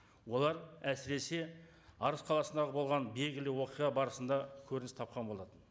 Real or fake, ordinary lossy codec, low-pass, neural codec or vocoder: real; none; none; none